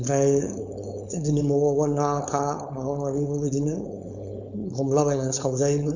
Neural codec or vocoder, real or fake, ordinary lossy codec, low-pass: codec, 16 kHz, 4.8 kbps, FACodec; fake; none; 7.2 kHz